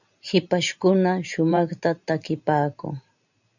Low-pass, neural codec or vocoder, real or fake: 7.2 kHz; vocoder, 44.1 kHz, 128 mel bands every 512 samples, BigVGAN v2; fake